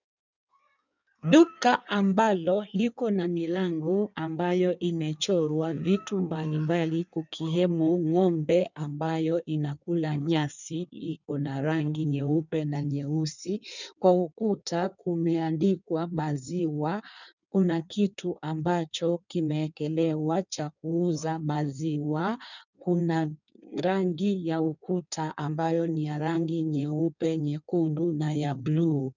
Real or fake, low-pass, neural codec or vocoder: fake; 7.2 kHz; codec, 16 kHz in and 24 kHz out, 1.1 kbps, FireRedTTS-2 codec